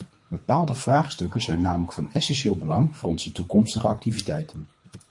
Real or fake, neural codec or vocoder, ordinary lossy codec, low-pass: fake; codec, 24 kHz, 3 kbps, HILCodec; MP3, 48 kbps; 10.8 kHz